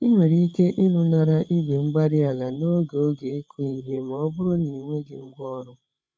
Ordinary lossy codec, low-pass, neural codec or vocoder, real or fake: none; none; codec, 16 kHz, 8 kbps, FreqCodec, larger model; fake